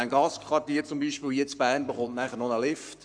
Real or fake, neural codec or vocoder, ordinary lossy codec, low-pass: fake; codec, 44.1 kHz, 7.8 kbps, Pupu-Codec; none; 9.9 kHz